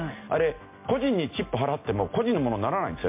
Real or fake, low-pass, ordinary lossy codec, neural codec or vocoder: real; 3.6 kHz; MP3, 24 kbps; none